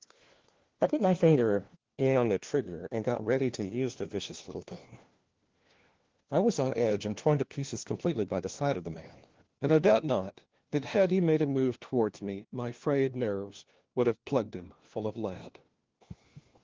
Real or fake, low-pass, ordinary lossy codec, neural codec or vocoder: fake; 7.2 kHz; Opus, 16 kbps; codec, 16 kHz, 1 kbps, FunCodec, trained on LibriTTS, 50 frames a second